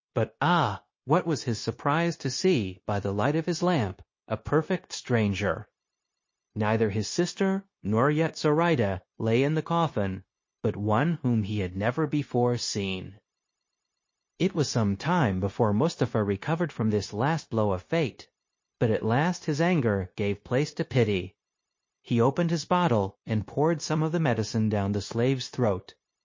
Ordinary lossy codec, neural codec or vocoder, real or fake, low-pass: MP3, 32 kbps; codec, 16 kHz, 0.9 kbps, LongCat-Audio-Codec; fake; 7.2 kHz